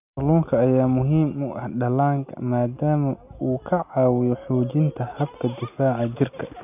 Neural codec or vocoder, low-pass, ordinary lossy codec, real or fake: none; 3.6 kHz; none; real